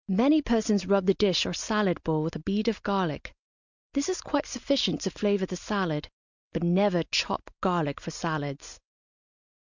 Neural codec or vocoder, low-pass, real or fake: none; 7.2 kHz; real